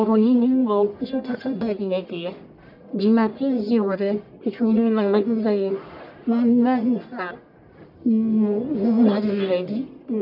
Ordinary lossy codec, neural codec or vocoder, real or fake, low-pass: none; codec, 44.1 kHz, 1.7 kbps, Pupu-Codec; fake; 5.4 kHz